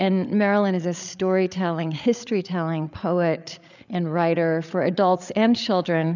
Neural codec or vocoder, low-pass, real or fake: codec, 16 kHz, 8 kbps, FreqCodec, larger model; 7.2 kHz; fake